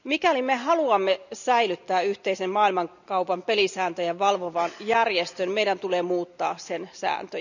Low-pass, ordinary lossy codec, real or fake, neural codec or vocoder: 7.2 kHz; none; real; none